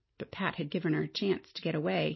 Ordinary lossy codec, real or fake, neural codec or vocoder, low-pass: MP3, 24 kbps; real; none; 7.2 kHz